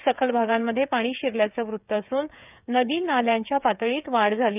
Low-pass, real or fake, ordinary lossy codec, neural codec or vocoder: 3.6 kHz; fake; none; codec, 16 kHz, 16 kbps, FreqCodec, smaller model